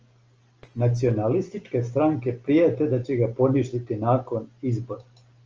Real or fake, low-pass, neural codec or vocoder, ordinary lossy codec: real; 7.2 kHz; none; Opus, 24 kbps